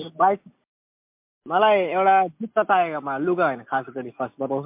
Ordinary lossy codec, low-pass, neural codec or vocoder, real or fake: MP3, 32 kbps; 3.6 kHz; none; real